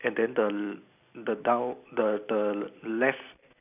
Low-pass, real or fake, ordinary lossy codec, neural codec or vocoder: 3.6 kHz; real; none; none